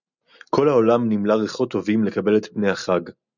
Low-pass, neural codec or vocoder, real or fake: 7.2 kHz; none; real